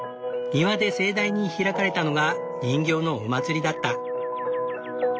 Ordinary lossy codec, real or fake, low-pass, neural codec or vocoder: none; real; none; none